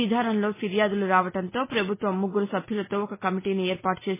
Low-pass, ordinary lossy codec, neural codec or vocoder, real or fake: 3.6 kHz; MP3, 16 kbps; none; real